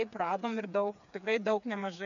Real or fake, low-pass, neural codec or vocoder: fake; 7.2 kHz; codec, 16 kHz, 4 kbps, FreqCodec, smaller model